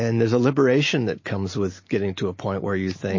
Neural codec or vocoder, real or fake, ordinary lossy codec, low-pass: none; real; MP3, 32 kbps; 7.2 kHz